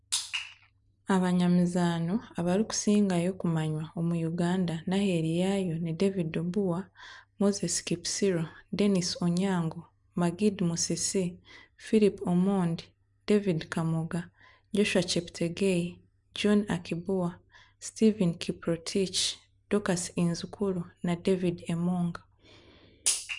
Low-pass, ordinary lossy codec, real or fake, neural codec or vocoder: 10.8 kHz; none; real; none